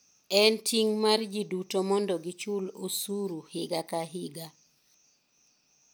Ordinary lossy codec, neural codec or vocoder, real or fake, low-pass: none; none; real; none